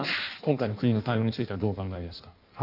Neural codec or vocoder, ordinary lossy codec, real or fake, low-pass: codec, 16 kHz in and 24 kHz out, 1.1 kbps, FireRedTTS-2 codec; none; fake; 5.4 kHz